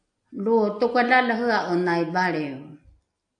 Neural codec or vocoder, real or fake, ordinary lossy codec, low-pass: none; real; AAC, 64 kbps; 9.9 kHz